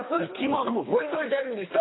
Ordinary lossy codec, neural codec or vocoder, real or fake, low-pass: AAC, 16 kbps; codec, 24 kHz, 3 kbps, HILCodec; fake; 7.2 kHz